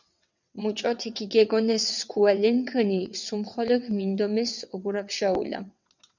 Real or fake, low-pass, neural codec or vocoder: fake; 7.2 kHz; vocoder, 22.05 kHz, 80 mel bands, WaveNeXt